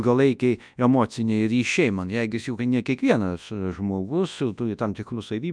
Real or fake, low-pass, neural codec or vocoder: fake; 9.9 kHz; codec, 24 kHz, 0.9 kbps, WavTokenizer, large speech release